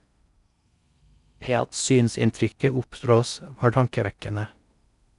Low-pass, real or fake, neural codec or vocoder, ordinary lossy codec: 10.8 kHz; fake; codec, 16 kHz in and 24 kHz out, 0.6 kbps, FocalCodec, streaming, 4096 codes; none